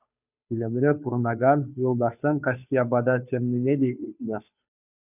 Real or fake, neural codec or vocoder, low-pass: fake; codec, 16 kHz, 2 kbps, FunCodec, trained on Chinese and English, 25 frames a second; 3.6 kHz